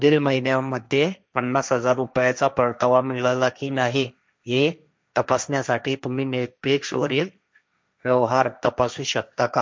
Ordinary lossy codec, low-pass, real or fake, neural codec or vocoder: none; none; fake; codec, 16 kHz, 1.1 kbps, Voila-Tokenizer